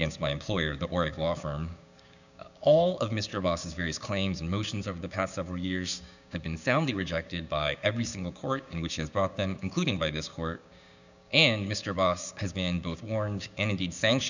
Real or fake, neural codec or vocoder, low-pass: fake; codec, 16 kHz, 6 kbps, DAC; 7.2 kHz